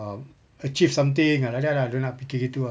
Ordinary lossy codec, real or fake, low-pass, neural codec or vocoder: none; real; none; none